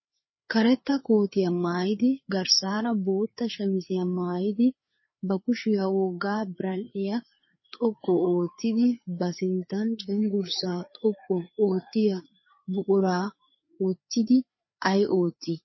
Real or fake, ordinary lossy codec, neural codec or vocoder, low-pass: fake; MP3, 24 kbps; codec, 16 kHz, 4 kbps, FreqCodec, larger model; 7.2 kHz